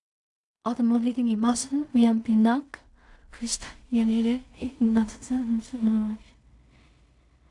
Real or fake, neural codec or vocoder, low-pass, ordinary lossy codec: fake; codec, 16 kHz in and 24 kHz out, 0.4 kbps, LongCat-Audio-Codec, two codebook decoder; 10.8 kHz; AAC, 64 kbps